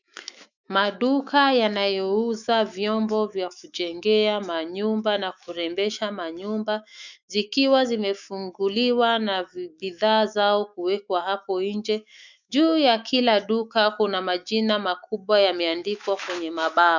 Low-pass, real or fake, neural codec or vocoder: 7.2 kHz; fake; autoencoder, 48 kHz, 128 numbers a frame, DAC-VAE, trained on Japanese speech